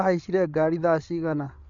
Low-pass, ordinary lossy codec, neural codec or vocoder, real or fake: 7.2 kHz; MP3, 64 kbps; codec, 16 kHz, 8 kbps, FreqCodec, larger model; fake